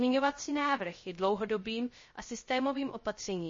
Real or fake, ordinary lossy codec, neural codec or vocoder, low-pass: fake; MP3, 32 kbps; codec, 16 kHz, 0.3 kbps, FocalCodec; 7.2 kHz